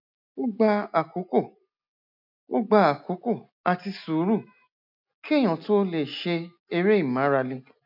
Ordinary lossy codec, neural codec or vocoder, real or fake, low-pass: none; none; real; 5.4 kHz